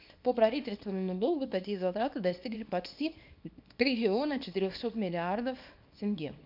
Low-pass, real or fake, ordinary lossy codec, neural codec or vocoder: 5.4 kHz; fake; none; codec, 24 kHz, 0.9 kbps, WavTokenizer, small release